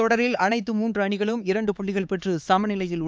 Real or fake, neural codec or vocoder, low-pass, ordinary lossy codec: fake; codec, 16 kHz, 4 kbps, X-Codec, HuBERT features, trained on LibriSpeech; none; none